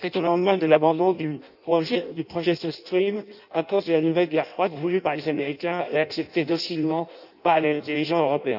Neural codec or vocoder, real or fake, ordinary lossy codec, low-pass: codec, 16 kHz in and 24 kHz out, 0.6 kbps, FireRedTTS-2 codec; fake; none; 5.4 kHz